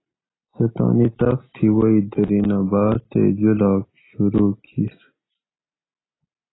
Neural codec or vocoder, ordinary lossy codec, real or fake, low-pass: none; AAC, 16 kbps; real; 7.2 kHz